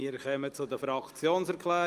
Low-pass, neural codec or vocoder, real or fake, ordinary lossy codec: 14.4 kHz; none; real; Opus, 32 kbps